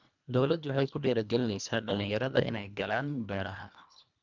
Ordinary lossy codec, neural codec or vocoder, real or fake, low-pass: none; codec, 24 kHz, 1.5 kbps, HILCodec; fake; 7.2 kHz